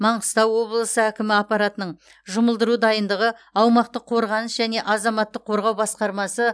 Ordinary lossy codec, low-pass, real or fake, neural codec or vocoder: none; none; real; none